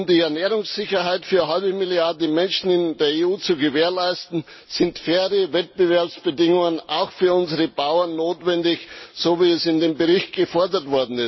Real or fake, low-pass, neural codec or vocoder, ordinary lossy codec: real; 7.2 kHz; none; MP3, 24 kbps